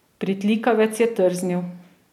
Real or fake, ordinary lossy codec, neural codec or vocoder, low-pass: real; none; none; 19.8 kHz